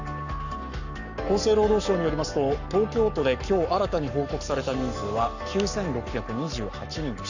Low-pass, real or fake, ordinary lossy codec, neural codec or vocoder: 7.2 kHz; fake; none; codec, 44.1 kHz, 7.8 kbps, Pupu-Codec